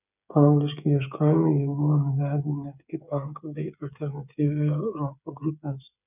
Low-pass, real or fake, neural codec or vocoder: 3.6 kHz; fake; codec, 16 kHz, 8 kbps, FreqCodec, smaller model